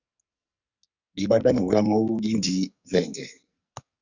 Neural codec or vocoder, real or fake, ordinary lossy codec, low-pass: codec, 44.1 kHz, 2.6 kbps, SNAC; fake; Opus, 64 kbps; 7.2 kHz